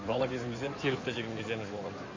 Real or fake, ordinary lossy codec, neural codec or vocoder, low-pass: fake; MP3, 32 kbps; codec, 16 kHz in and 24 kHz out, 2.2 kbps, FireRedTTS-2 codec; 7.2 kHz